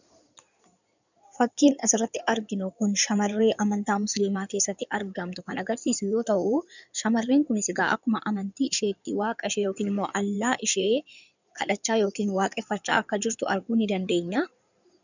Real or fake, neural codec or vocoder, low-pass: fake; codec, 16 kHz in and 24 kHz out, 2.2 kbps, FireRedTTS-2 codec; 7.2 kHz